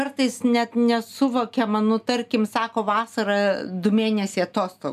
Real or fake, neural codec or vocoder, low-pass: real; none; 14.4 kHz